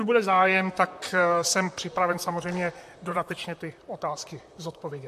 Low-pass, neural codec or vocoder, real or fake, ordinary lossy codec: 14.4 kHz; vocoder, 44.1 kHz, 128 mel bands, Pupu-Vocoder; fake; MP3, 64 kbps